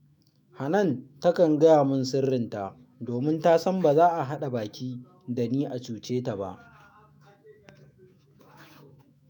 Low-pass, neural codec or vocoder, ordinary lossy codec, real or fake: none; autoencoder, 48 kHz, 128 numbers a frame, DAC-VAE, trained on Japanese speech; none; fake